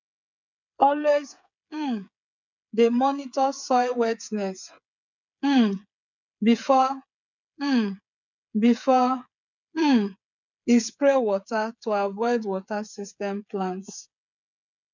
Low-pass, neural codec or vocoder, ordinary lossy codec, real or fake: 7.2 kHz; codec, 16 kHz, 8 kbps, FreqCodec, smaller model; none; fake